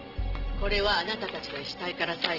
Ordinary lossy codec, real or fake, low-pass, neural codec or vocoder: Opus, 16 kbps; real; 5.4 kHz; none